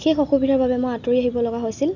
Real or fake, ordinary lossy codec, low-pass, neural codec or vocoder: real; none; 7.2 kHz; none